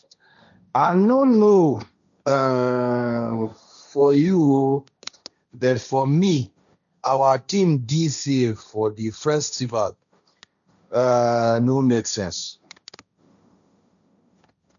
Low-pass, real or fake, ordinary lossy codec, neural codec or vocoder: 7.2 kHz; fake; none; codec, 16 kHz, 1.1 kbps, Voila-Tokenizer